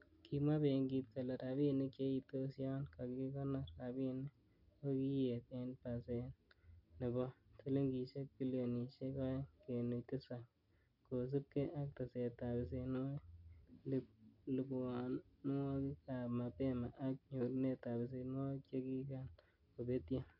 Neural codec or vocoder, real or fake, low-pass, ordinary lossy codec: none; real; 5.4 kHz; none